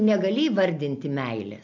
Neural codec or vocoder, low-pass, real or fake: none; 7.2 kHz; real